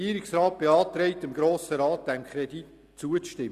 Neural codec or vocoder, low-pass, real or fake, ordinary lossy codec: none; 14.4 kHz; real; Opus, 64 kbps